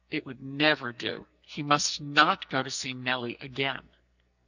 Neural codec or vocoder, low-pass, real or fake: codec, 44.1 kHz, 2.6 kbps, SNAC; 7.2 kHz; fake